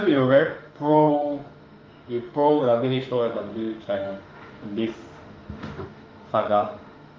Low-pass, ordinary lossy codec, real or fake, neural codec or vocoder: 7.2 kHz; Opus, 24 kbps; fake; autoencoder, 48 kHz, 32 numbers a frame, DAC-VAE, trained on Japanese speech